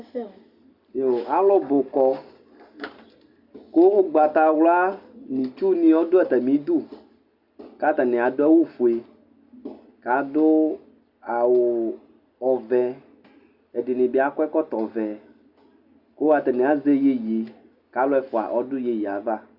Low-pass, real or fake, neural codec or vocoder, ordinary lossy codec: 5.4 kHz; real; none; Opus, 64 kbps